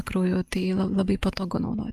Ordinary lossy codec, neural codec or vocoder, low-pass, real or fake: Opus, 32 kbps; none; 14.4 kHz; real